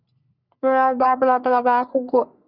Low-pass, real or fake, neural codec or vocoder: 5.4 kHz; fake; codec, 44.1 kHz, 3.4 kbps, Pupu-Codec